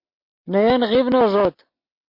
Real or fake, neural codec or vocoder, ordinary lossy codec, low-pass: real; none; MP3, 32 kbps; 5.4 kHz